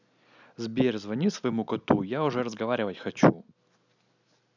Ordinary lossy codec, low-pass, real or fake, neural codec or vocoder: none; 7.2 kHz; real; none